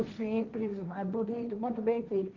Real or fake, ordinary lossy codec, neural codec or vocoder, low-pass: fake; Opus, 24 kbps; codec, 16 kHz, 1.1 kbps, Voila-Tokenizer; 7.2 kHz